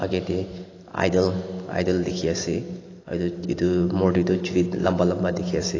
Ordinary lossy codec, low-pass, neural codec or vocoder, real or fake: AAC, 32 kbps; 7.2 kHz; none; real